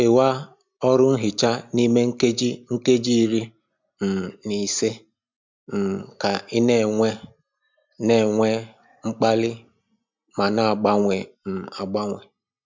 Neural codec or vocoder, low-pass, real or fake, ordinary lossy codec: none; 7.2 kHz; real; MP3, 64 kbps